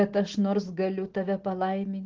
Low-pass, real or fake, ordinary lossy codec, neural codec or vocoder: 7.2 kHz; real; Opus, 16 kbps; none